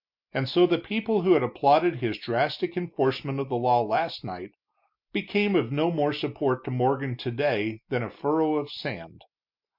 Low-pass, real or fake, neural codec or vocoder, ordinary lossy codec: 5.4 kHz; real; none; MP3, 48 kbps